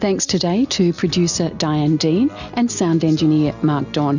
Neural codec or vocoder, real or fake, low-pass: none; real; 7.2 kHz